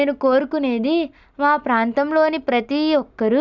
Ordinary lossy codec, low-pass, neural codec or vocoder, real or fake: none; 7.2 kHz; none; real